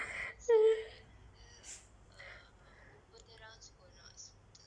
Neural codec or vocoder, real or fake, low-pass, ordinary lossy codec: none; real; 9.9 kHz; none